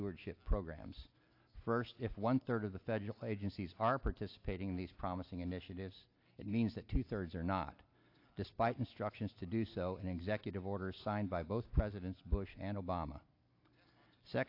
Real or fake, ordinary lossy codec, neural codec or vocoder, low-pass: real; AAC, 32 kbps; none; 5.4 kHz